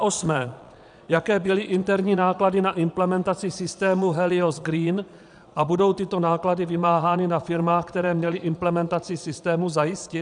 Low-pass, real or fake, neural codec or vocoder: 9.9 kHz; fake; vocoder, 22.05 kHz, 80 mel bands, Vocos